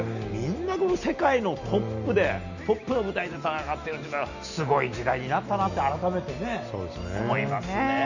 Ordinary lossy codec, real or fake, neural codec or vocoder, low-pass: none; real; none; 7.2 kHz